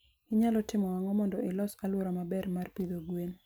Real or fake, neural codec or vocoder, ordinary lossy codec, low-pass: real; none; none; none